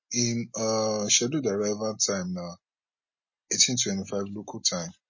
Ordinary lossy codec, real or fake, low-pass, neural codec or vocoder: MP3, 32 kbps; real; 7.2 kHz; none